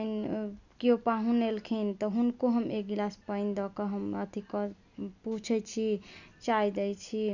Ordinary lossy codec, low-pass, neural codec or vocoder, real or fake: none; 7.2 kHz; none; real